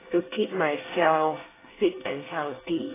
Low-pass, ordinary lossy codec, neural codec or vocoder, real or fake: 3.6 kHz; AAC, 16 kbps; codec, 24 kHz, 1 kbps, SNAC; fake